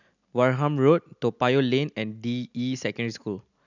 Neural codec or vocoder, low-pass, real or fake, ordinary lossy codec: none; 7.2 kHz; real; none